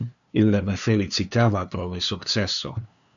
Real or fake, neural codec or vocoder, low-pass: fake; codec, 16 kHz, 2 kbps, FunCodec, trained on LibriTTS, 25 frames a second; 7.2 kHz